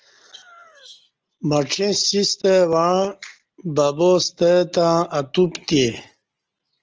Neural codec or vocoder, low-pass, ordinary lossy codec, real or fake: none; 7.2 kHz; Opus, 24 kbps; real